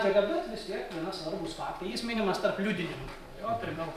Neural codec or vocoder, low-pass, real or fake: vocoder, 44.1 kHz, 128 mel bands every 256 samples, BigVGAN v2; 14.4 kHz; fake